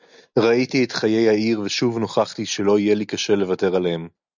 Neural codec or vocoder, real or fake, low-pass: none; real; 7.2 kHz